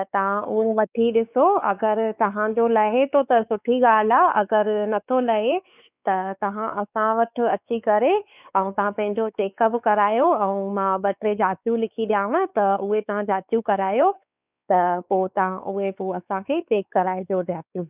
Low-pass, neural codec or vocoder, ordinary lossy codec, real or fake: 3.6 kHz; codec, 16 kHz, 4 kbps, X-Codec, WavLM features, trained on Multilingual LibriSpeech; none; fake